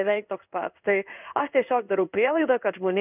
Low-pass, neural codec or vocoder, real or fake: 3.6 kHz; codec, 16 kHz in and 24 kHz out, 1 kbps, XY-Tokenizer; fake